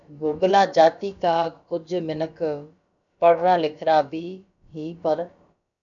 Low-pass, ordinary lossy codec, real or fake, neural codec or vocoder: 7.2 kHz; MP3, 96 kbps; fake; codec, 16 kHz, about 1 kbps, DyCAST, with the encoder's durations